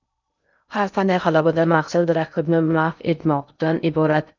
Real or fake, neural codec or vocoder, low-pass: fake; codec, 16 kHz in and 24 kHz out, 0.6 kbps, FocalCodec, streaming, 2048 codes; 7.2 kHz